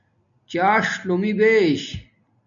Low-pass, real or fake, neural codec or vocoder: 7.2 kHz; real; none